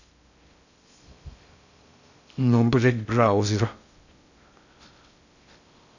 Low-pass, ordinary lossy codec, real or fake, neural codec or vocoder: 7.2 kHz; none; fake; codec, 16 kHz in and 24 kHz out, 0.6 kbps, FocalCodec, streaming, 2048 codes